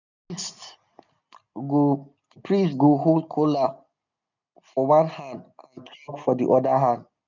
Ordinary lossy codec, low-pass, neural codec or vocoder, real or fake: none; 7.2 kHz; none; real